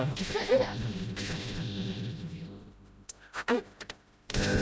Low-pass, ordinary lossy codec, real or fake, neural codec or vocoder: none; none; fake; codec, 16 kHz, 0.5 kbps, FreqCodec, smaller model